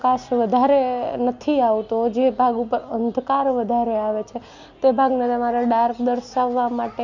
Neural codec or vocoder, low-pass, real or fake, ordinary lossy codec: none; 7.2 kHz; real; none